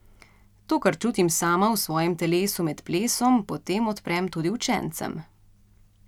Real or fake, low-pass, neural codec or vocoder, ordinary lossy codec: real; 19.8 kHz; none; none